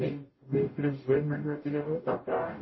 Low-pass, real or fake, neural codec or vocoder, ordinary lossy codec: 7.2 kHz; fake; codec, 44.1 kHz, 0.9 kbps, DAC; MP3, 24 kbps